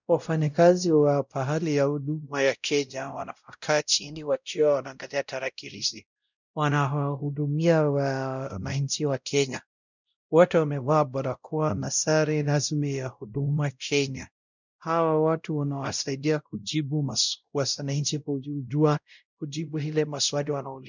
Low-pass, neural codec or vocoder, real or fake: 7.2 kHz; codec, 16 kHz, 0.5 kbps, X-Codec, WavLM features, trained on Multilingual LibriSpeech; fake